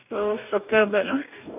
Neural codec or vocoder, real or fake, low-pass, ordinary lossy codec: codec, 44.1 kHz, 2.6 kbps, DAC; fake; 3.6 kHz; none